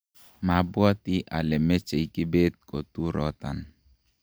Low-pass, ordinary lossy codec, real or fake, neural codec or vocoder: none; none; real; none